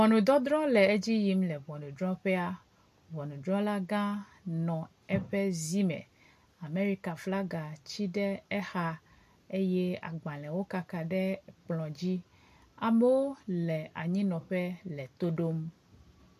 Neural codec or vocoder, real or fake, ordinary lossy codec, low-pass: none; real; MP3, 64 kbps; 14.4 kHz